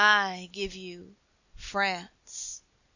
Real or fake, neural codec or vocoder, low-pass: real; none; 7.2 kHz